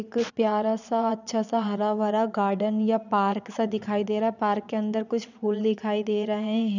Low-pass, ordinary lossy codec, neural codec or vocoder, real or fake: 7.2 kHz; none; vocoder, 22.05 kHz, 80 mel bands, Vocos; fake